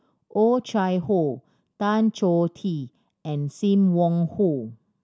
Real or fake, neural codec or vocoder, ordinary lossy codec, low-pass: real; none; none; none